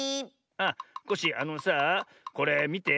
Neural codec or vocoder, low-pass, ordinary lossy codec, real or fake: none; none; none; real